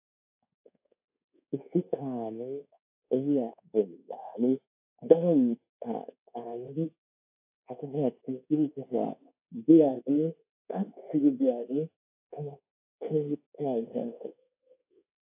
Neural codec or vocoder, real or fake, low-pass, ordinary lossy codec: codec, 24 kHz, 1.2 kbps, DualCodec; fake; 3.6 kHz; AAC, 32 kbps